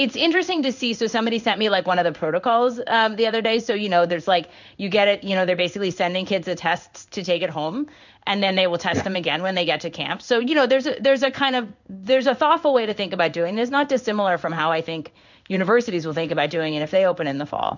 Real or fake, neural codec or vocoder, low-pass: fake; codec, 16 kHz in and 24 kHz out, 1 kbps, XY-Tokenizer; 7.2 kHz